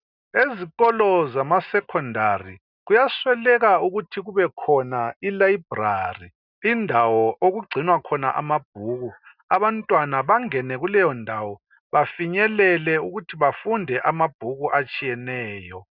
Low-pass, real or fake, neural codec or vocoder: 5.4 kHz; real; none